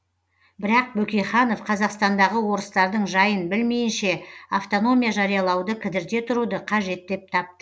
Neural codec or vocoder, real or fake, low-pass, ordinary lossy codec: none; real; none; none